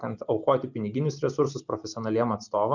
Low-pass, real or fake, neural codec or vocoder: 7.2 kHz; real; none